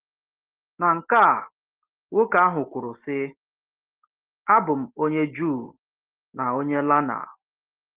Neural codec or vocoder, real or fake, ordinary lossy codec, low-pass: none; real; Opus, 16 kbps; 3.6 kHz